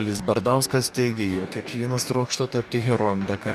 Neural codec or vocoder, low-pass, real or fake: codec, 44.1 kHz, 2.6 kbps, DAC; 14.4 kHz; fake